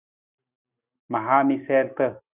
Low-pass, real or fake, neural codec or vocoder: 3.6 kHz; real; none